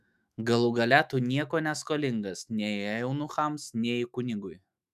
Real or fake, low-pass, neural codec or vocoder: fake; 14.4 kHz; autoencoder, 48 kHz, 128 numbers a frame, DAC-VAE, trained on Japanese speech